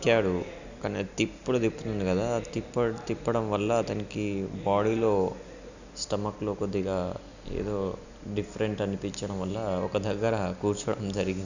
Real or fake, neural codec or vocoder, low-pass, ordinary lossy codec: real; none; 7.2 kHz; none